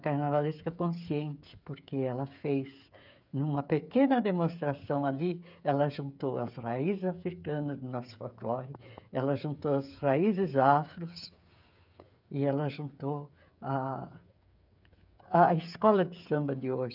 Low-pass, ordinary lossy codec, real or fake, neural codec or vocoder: 5.4 kHz; none; fake; codec, 16 kHz, 8 kbps, FreqCodec, smaller model